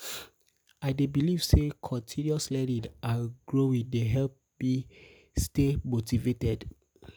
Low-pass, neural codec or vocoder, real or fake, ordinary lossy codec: none; none; real; none